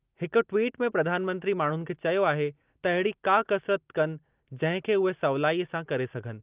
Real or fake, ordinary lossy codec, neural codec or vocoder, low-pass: real; Opus, 32 kbps; none; 3.6 kHz